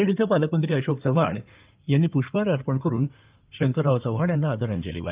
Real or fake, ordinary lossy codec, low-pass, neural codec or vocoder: fake; Opus, 32 kbps; 3.6 kHz; codec, 16 kHz in and 24 kHz out, 2.2 kbps, FireRedTTS-2 codec